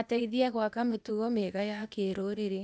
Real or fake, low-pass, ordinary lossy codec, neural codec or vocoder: fake; none; none; codec, 16 kHz, 0.8 kbps, ZipCodec